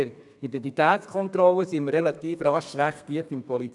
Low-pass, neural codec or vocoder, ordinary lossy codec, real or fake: 10.8 kHz; codec, 32 kHz, 1.9 kbps, SNAC; none; fake